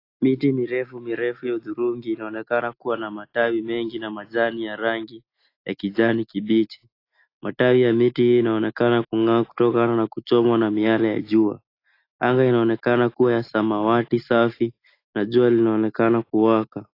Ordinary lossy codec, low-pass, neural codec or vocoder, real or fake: AAC, 32 kbps; 5.4 kHz; none; real